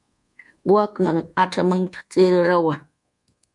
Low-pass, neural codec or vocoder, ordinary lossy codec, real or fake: 10.8 kHz; codec, 24 kHz, 1.2 kbps, DualCodec; MP3, 64 kbps; fake